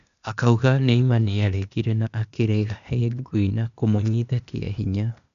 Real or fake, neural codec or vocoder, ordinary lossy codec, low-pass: fake; codec, 16 kHz, 0.8 kbps, ZipCodec; none; 7.2 kHz